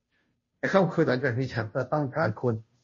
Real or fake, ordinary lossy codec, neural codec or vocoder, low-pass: fake; MP3, 32 kbps; codec, 16 kHz, 0.5 kbps, FunCodec, trained on Chinese and English, 25 frames a second; 7.2 kHz